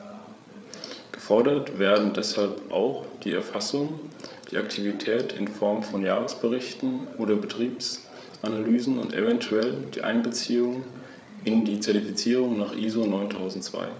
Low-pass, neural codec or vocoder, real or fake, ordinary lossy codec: none; codec, 16 kHz, 8 kbps, FreqCodec, larger model; fake; none